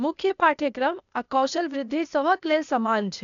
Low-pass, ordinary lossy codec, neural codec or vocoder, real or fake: 7.2 kHz; none; codec, 16 kHz, 0.8 kbps, ZipCodec; fake